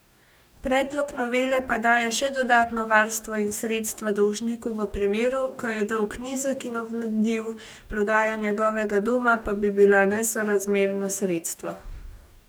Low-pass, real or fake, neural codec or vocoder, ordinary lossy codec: none; fake; codec, 44.1 kHz, 2.6 kbps, DAC; none